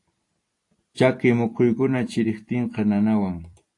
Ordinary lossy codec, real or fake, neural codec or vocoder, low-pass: AAC, 48 kbps; fake; vocoder, 44.1 kHz, 128 mel bands every 256 samples, BigVGAN v2; 10.8 kHz